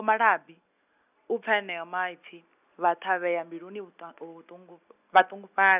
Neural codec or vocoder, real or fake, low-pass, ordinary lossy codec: none; real; 3.6 kHz; none